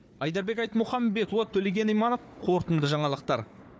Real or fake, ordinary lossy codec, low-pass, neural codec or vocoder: fake; none; none; codec, 16 kHz, 4 kbps, FunCodec, trained on Chinese and English, 50 frames a second